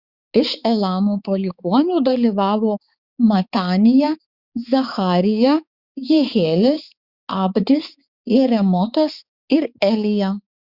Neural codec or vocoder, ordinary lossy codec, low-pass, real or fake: codec, 16 kHz, 4 kbps, X-Codec, HuBERT features, trained on balanced general audio; Opus, 64 kbps; 5.4 kHz; fake